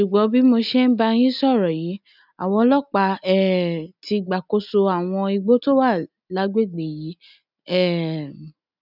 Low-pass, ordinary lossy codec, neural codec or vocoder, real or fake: 5.4 kHz; none; none; real